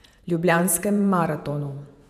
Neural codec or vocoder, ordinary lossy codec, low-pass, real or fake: vocoder, 48 kHz, 128 mel bands, Vocos; none; 14.4 kHz; fake